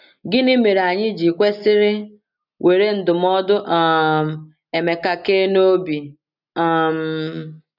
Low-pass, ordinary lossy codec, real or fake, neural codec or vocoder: 5.4 kHz; none; real; none